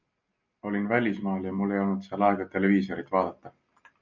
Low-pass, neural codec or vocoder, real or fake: 7.2 kHz; none; real